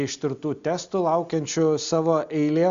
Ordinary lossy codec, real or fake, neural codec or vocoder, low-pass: Opus, 64 kbps; real; none; 7.2 kHz